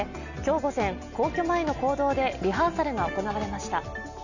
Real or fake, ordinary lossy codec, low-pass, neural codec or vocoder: real; none; 7.2 kHz; none